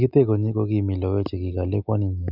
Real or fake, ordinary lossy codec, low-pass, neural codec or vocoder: real; none; 5.4 kHz; none